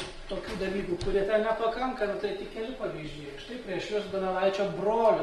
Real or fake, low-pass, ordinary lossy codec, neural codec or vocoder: real; 10.8 kHz; Opus, 24 kbps; none